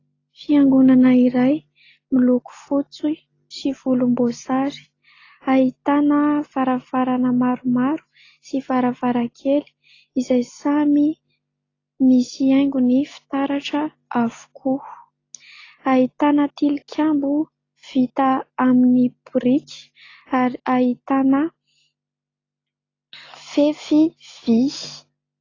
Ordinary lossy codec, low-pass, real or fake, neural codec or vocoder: AAC, 32 kbps; 7.2 kHz; real; none